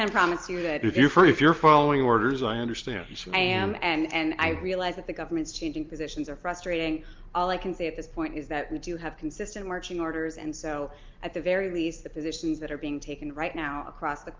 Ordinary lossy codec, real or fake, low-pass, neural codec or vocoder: Opus, 24 kbps; real; 7.2 kHz; none